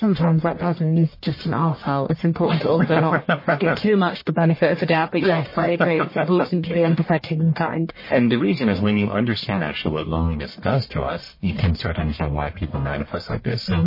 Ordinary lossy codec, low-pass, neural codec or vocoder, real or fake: MP3, 24 kbps; 5.4 kHz; codec, 44.1 kHz, 1.7 kbps, Pupu-Codec; fake